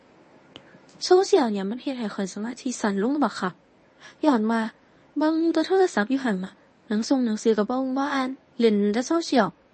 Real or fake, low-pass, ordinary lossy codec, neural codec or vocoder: fake; 10.8 kHz; MP3, 32 kbps; codec, 24 kHz, 0.9 kbps, WavTokenizer, medium speech release version 1